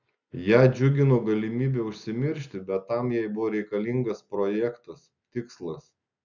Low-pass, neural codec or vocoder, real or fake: 7.2 kHz; none; real